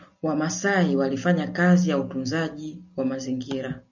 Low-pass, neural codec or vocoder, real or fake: 7.2 kHz; none; real